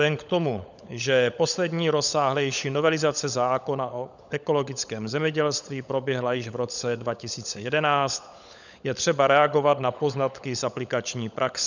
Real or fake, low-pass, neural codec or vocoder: fake; 7.2 kHz; codec, 16 kHz, 16 kbps, FunCodec, trained on LibriTTS, 50 frames a second